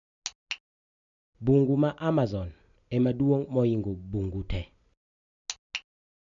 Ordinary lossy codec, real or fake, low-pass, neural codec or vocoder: none; real; 7.2 kHz; none